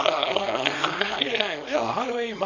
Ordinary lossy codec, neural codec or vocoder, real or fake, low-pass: none; codec, 24 kHz, 0.9 kbps, WavTokenizer, small release; fake; 7.2 kHz